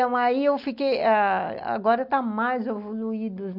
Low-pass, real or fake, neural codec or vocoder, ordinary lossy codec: 5.4 kHz; real; none; none